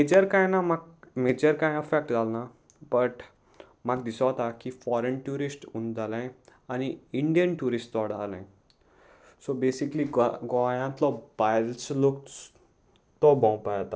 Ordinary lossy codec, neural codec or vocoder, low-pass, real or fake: none; none; none; real